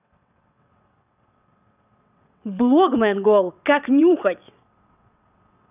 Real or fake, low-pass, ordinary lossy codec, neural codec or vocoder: fake; 3.6 kHz; none; vocoder, 22.05 kHz, 80 mel bands, WaveNeXt